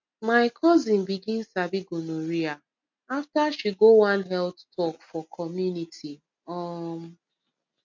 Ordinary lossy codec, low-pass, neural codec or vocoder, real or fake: MP3, 48 kbps; 7.2 kHz; none; real